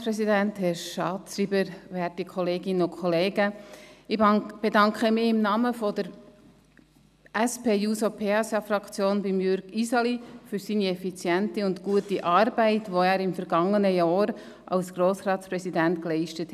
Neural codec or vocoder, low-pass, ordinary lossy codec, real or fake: none; 14.4 kHz; none; real